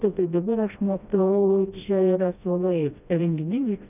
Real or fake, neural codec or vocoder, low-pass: fake; codec, 16 kHz, 1 kbps, FreqCodec, smaller model; 3.6 kHz